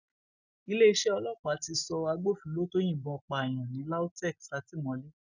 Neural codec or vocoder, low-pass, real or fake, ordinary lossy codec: none; 7.2 kHz; real; none